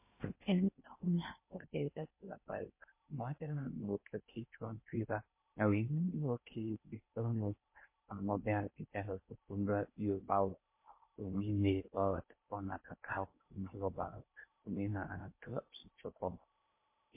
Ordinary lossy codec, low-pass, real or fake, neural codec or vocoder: MP3, 24 kbps; 3.6 kHz; fake; codec, 16 kHz in and 24 kHz out, 0.6 kbps, FocalCodec, streaming, 2048 codes